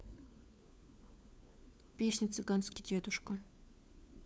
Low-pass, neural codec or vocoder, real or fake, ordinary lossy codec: none; codec, 16 kHz, 4 kbps, FunCodec, trained on LibriTTS, 50 frames a second; fake; none